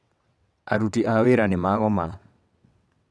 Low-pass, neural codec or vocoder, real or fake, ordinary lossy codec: none; vocoder, 22.05 kHz, 80 mel bands, WaveNeXt; fake; none